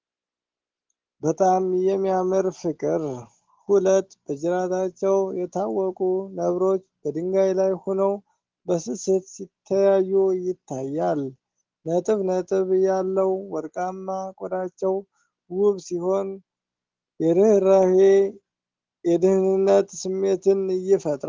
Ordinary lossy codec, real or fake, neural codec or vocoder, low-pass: Opus, 16 kbps; real; none; 7.2 kHz